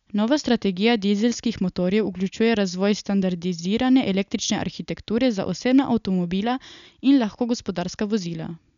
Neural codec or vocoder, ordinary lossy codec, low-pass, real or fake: none; none; 7.2 kHz; real